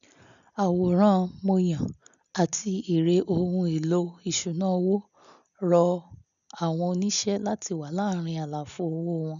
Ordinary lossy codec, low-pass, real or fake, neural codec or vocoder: none; 7.2 kHz; real; none